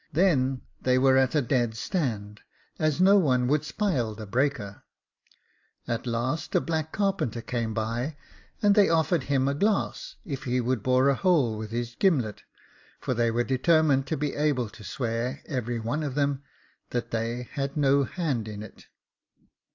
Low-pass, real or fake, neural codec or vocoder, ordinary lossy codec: 7.2 kHz; real; none; AAC, 48 kbps